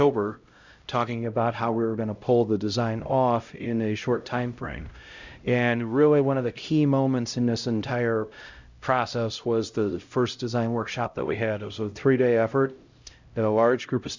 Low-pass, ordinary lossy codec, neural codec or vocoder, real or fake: 7.2 kHz; Opus, 64 kbps; codec, 16 kHz, 0.5 kbps, X-Codec, HuBERT features, trained on LibriSpeech; fake